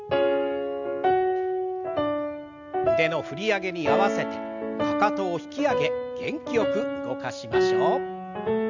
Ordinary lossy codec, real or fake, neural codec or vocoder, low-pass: none; real; none; 7.2 kHz